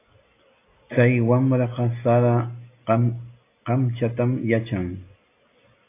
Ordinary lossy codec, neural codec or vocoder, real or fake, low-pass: AAC, 24 kbps; none; real; 3.6 kHz